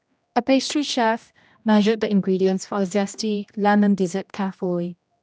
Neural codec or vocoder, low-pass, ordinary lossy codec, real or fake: codec, 16 kHz, 1 kbps, X-Codec, HuBERT features, trained on general audio; none; none; fake